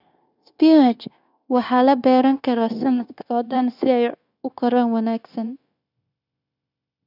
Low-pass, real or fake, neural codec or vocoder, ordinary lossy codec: 5.4 kHz; fake; codec, 16 kHz, 0.9 kbps, LongCat-Audio-Codec; none